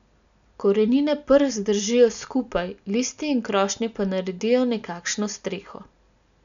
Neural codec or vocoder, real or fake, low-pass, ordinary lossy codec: none; real; 7.2 kHz; none